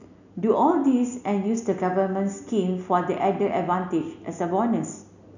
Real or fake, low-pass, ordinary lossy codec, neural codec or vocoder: real; 7.2 kHz; none; none